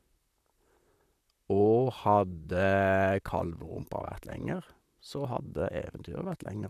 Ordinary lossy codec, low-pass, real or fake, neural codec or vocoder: none; 14.4 kHz; fake; vocoder, 44.1 kHz, 128 mel bands, Pupu-Vocoder